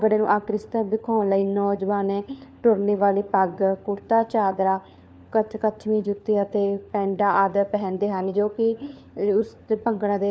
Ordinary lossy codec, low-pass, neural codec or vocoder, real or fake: none; none; codec, 16 kHz, 4 kbps, FunCodec, trained on LibriTTS, 50 frames a second; fake